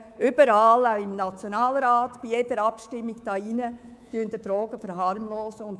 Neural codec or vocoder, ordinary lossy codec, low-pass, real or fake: codec, 24 kHz, 3.1 kbps, DualCodec; none; none; fake